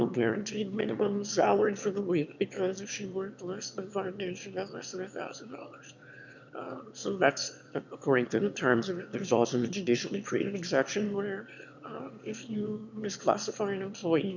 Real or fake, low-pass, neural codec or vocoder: fake; 7.2 kHz; autoencoder, 22.05 kHz, a latent of 192 numbers a frame, VITS, trained on one speaker